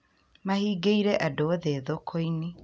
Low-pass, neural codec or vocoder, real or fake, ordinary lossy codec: none; none; real; none